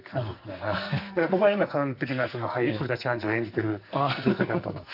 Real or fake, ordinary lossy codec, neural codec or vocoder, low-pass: fake; none; codec, 44.1 kHz, 2.6 kbps, SNAC; 5.4 kHz